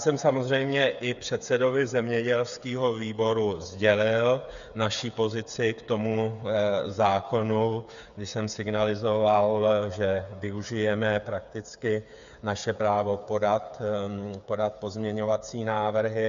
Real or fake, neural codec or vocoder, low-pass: fake; codec, 16 kHz, 8 kbps, FreqCodec, smaller model; 7.2 kHz